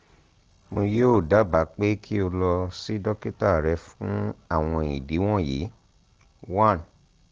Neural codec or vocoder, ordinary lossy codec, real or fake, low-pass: none; Opus, 16 kbps; real; 7.2 kHz